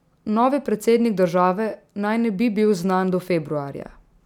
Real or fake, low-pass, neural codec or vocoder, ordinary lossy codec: real; 19.8 kHz; none; none